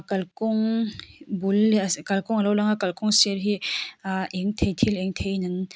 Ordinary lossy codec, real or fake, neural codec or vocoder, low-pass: none; real; none; none